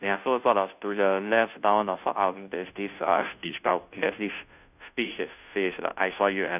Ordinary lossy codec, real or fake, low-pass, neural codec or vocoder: none; fake; 3.6 kHz; codec, 16 kHz, 0.5 kbps, FunCodec, trained on Chinese and English, 25 frames a second